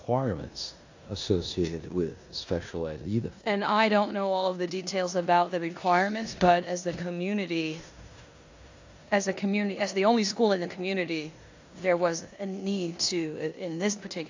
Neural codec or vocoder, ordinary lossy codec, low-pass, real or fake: codec, 16 kHz in and 24 kHz out, 0.9 kbps, LongCat-Audio-Codec, four codebook decoder; AAC, 48 kbps; 7.2 kHz; fake